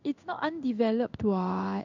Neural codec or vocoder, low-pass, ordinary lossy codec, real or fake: codec, 16 kHz in and 24 kHz out, 1 kbps, XY-Tokenizer; 7.2 kHz; none; fake